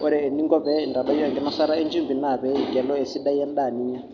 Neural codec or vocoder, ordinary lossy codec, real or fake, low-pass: none; none; real; 7.2 kHz